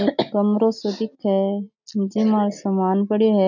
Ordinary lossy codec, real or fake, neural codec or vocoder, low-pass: none; real; none; 7.2 kHz